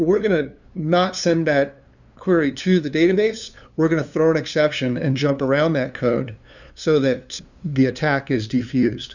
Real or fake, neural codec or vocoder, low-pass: fake; codec, 16 kHz, 2 kbps, FunCodec, trained on LibriTTS, 25 frames a second; 7.2 kHz